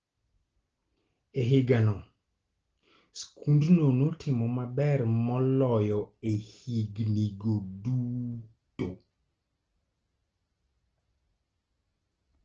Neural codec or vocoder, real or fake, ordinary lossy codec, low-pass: none; real; Opus, 16 kbps; 7.2 kHz